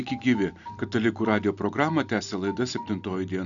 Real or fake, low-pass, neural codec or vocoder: real; 7.2 kHz; none